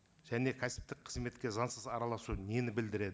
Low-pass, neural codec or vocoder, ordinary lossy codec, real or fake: none; none; none; real